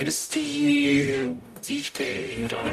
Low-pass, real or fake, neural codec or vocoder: 14.4 kHz; fake; codec, 44.1 kHz, 0.9 kbps, DAC